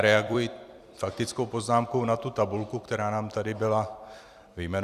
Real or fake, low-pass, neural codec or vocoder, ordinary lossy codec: fake; 14.4 kHz; vocoder, 44.1 kHz, 128 mel bands every 256 samples, BigVGAN v2; Opus, 64 kbps